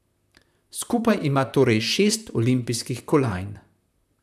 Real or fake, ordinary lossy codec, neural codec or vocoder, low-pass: fake; none; vocoder, 44.1 kHz, 128 mel bands, Pupu-Vocoder; 14.4 kHz